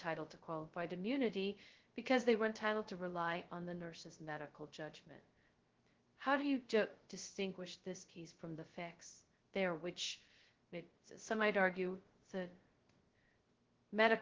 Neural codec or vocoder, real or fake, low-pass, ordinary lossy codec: codec, 16 kHz, 0.2 kbps, FocalCodec; fake; 7.2 kHz; Opus, 16 kbps